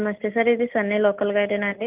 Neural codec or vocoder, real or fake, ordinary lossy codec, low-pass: none; real; Opus, 32 kbps; 3.6 kHz